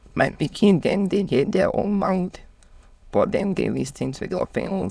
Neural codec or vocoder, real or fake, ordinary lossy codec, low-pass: autoencoder, 22.05 kHz, a latent of 192 numbers a frame, VITS, trained on many speakers; fake; none; none